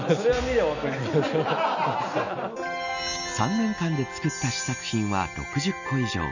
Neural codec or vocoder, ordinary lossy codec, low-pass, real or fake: none; none; 7.2 kHz; real